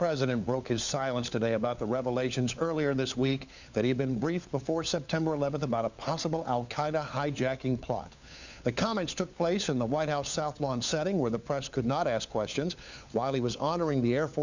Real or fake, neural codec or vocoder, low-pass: fake; codec, 16 kHz, 4 kbps, FunCodec, trained on LibriTTS, 50 frames a second; 7.2 kHz